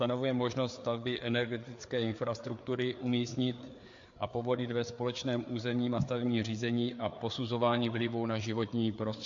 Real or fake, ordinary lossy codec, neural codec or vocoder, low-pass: fake; MP3, 48 kbps; codec, 16 kHz, 4 kbps, FreqCodec, larger model; 7.2 kHz